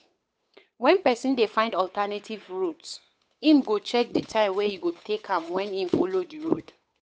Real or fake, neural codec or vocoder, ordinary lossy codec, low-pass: fake; codec, 16 kHz, 8 kbps, FunCodec, trained on Chinese and English, 25 frames a second; none; none